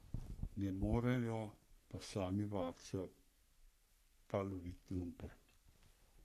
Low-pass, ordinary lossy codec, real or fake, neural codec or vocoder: 14.4 kHz; AAC, 64 kbps; fake; codec, 44.1 kHz, 3.4 kbps, Pupu-Codec